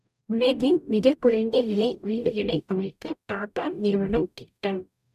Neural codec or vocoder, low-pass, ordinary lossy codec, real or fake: codec, 44.1 kHz, 0.9 kbps, DAC; 14.4 kHz; none; fake